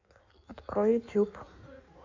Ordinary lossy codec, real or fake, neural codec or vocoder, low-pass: AAC, 48 kbps; fake; codec, 16 kHz in and 24 kHz out, 1.1 kbps, FireRedTTS-2 codec; 7.2 kHz